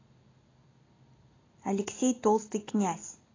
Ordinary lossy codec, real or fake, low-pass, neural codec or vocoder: AAC, 32 kbps; real; 7.2 kHz; none